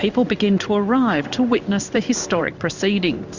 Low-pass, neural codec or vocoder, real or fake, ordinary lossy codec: 7.2 kHz; codec, 16 kHz in and 24 kHz out, 1 kbps, XY-Tokenizer; fake; Opus, 64 kbps